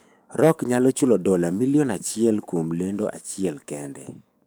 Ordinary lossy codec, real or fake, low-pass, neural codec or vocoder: none; fake; none; codec, 44.1 kHz, 7.8 kbps, DAC